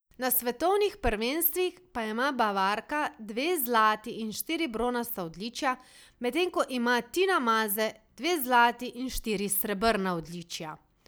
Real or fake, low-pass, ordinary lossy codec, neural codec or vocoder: real; none; none; none